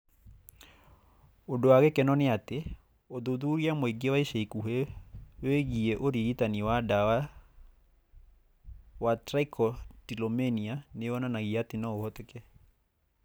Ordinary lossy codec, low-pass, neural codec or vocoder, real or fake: none; none; none; real